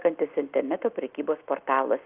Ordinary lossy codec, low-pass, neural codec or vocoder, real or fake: Opus, 32 kbps; 3.6 kHz; none; real